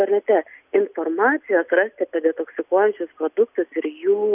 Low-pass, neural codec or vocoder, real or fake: 3.6 kHz; none; real